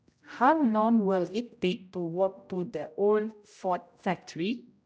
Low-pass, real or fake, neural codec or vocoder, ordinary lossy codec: none; fake; codec, 16 kHz, 0.5 kbps, X-Codec, HuBERT features, trained on general audio; none